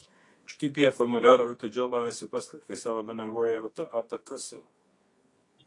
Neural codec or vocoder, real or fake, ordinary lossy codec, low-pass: codec, 24 kHz, 0.9 kbps, WavTokenizer, medium music audio release; fake; AAC, 48 kbps; 10.8 kHz